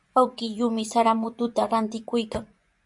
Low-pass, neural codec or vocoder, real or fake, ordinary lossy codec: 10.8 kHz; vocoder, 24 kHz, 100 mel bands, Vocos; fake; MP3, 64 kbps